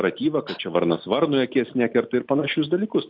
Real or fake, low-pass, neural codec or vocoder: real; 5.4 kHz; none